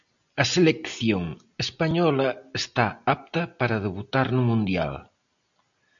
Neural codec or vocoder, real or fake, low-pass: none; real; 7.2 kHz